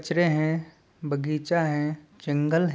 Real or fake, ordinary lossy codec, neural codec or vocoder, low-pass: real; none; none; none